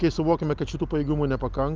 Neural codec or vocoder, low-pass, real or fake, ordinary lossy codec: none; 7.2 kHz; real; Opus, 24 kbps